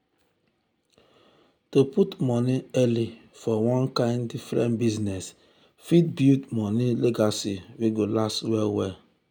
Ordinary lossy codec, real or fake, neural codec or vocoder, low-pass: none; real; none; none